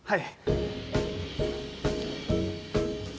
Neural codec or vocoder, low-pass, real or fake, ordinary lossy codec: none; none; real; none